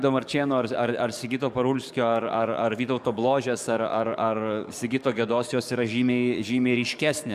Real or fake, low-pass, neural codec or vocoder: fake; 14.4 kHz; codec, 44.1 kHz, 7.8 kbps, DAC